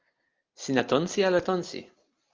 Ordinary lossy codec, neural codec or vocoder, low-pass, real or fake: Opus, 24 kbps; none; 7.2 kHz; real